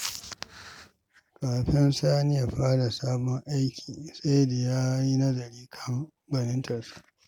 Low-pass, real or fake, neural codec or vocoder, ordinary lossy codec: 19.8 kHz; real; none; none